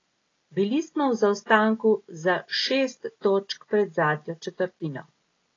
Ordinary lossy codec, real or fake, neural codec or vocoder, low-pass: AAC, 32 kbps; real; none; 7.2 kHz